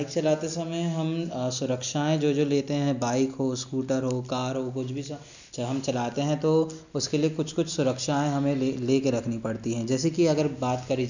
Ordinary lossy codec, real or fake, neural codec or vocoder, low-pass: none; real; none; 7.2 kHz